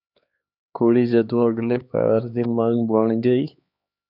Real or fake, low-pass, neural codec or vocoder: fake; 5.4 kHz; codec, 16 kHz, 2 kbps, X-Codec, HuBERT features, trained on LibriSpeech